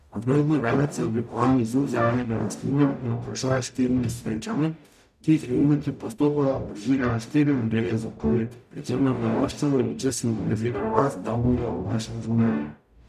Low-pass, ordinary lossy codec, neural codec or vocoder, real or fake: 14.4 kHz; none; codec, 44.1 kHz, 0.9 kbps, DAC; fake